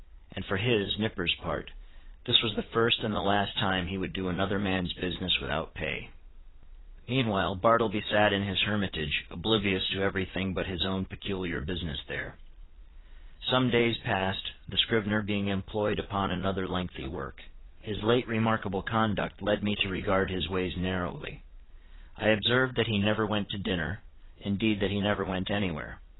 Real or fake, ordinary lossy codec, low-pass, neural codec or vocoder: fake; AAC, 16 kbps; 7.2 kHz; vocoder, 22.05 kHz, 80 mel bands, Vocos